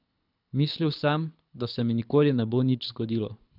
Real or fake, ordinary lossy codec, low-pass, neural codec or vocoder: fake; none; 5.4 kHz; codec, 24 kHz, 6 kbps, HILCodec